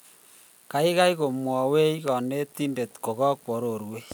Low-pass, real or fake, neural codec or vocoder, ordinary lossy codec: none; real; none; none